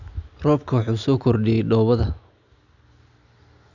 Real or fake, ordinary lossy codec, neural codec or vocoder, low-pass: real; none; none; 7.2 kHz